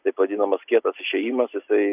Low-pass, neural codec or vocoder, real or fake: 3.6 kHz; none; real